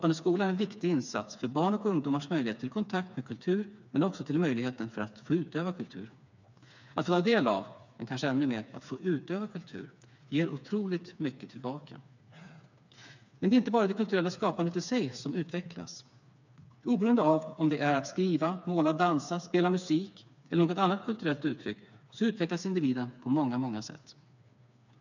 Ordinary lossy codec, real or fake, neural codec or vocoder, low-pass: none; fake; codec, 16 kHz, 4 kbps, FreqCodec, smaller model; 7.2 kHz